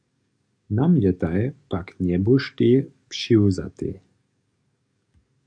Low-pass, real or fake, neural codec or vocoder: 9.9 kHz; fake; codec, 44.1 kHz, 7.8 kbps, DAC